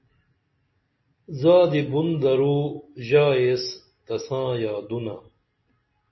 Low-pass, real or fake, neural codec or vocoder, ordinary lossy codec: 7.2 kHz; real; none; MP3, 24 kbps